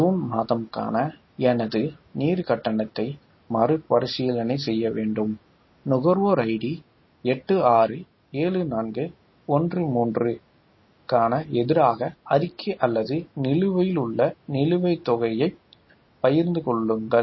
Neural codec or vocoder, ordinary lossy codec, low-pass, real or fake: none; MP3, 24 kbps; 7.2 kHz; real